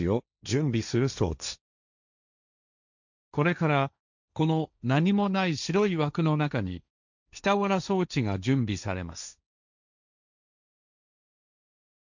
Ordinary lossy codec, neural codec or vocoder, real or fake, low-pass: none; codec, 16 kHz, 1.1 kbps, Voila-Tokenizer; fake; 7.2 kHz